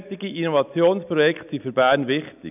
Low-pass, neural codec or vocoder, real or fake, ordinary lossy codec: 3.6 kHz; none; real; none